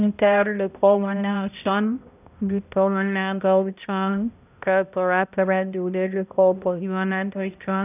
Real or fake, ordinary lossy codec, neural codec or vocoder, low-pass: fake; none; codec, 16 kHz, 0.5 kbps, X-Codec, HuBERT features, trained on balanced general audio; 3.6 kHz